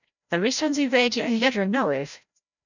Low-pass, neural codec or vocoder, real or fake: 7.2 kHz; codec, 16 kHz, 0.5 kbps, FreqCodec, larger model; fake